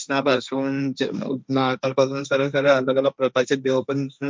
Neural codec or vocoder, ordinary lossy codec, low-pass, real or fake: codec, 16 kHz, 1.1 kbps, Voila-Tokenizer; none; none; fake